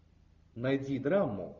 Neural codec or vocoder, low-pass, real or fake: none; 7.2 kHz; real